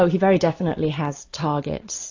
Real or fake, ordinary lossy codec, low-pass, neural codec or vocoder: real; AAC, 48 kbps; 7.2 kHz; none